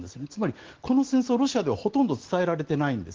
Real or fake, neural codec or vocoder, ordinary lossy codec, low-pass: real; none; Opus, 16 kbps; 7.2 kHz